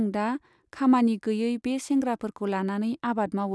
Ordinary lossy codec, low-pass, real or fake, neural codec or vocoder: none; none; real; none